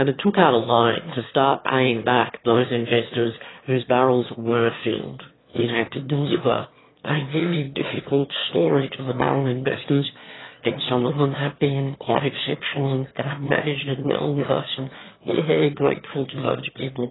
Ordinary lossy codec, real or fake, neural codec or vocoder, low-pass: AAC, 16 kbps; fake; autoencoder, 22.05 kHz, a latent of 192 numbers a frame, VITS, trained on one speaker; 7.2 kHz